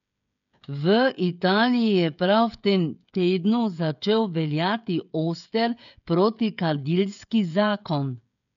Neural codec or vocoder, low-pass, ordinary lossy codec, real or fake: codec, 16 kHz, 16 kbps, FreqCodec, smaller model; 7.2 kHz; none; fake